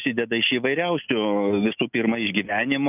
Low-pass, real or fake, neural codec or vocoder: 3.6 kHz; real; none